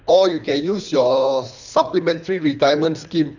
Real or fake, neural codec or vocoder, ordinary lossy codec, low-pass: fake; codec, 24 kHz, 3 kbps, HILCodec; none; 7.2 kHz